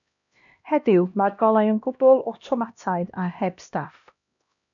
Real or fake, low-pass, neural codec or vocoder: fake; 7.2 kHz; codec, 16 kHz, 1 kbps, X-Codec, HuBERT features, trained on LibriSpeech